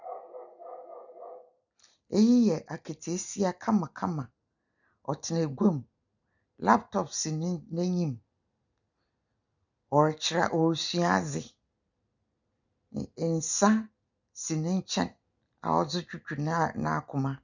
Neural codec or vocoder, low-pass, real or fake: none; 7.2 kHz; real